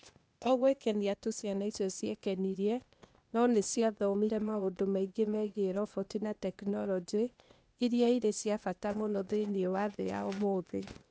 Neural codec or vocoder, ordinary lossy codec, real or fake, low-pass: codec, 16 kHz, 0.8 kbps, ZipCodec; none; fake; none